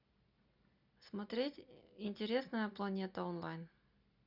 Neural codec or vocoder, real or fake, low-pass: none; real; 5.4 kHz